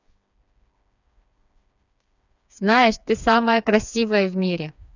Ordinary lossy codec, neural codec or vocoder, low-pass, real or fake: none; codec, 16 kHz, 4 kbps, FreqCodec, smaller model; 7.2 kHz; fake